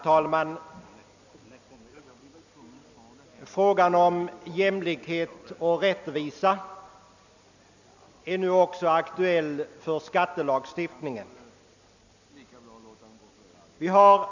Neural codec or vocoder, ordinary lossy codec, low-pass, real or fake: none; none; 7.2 kHz; real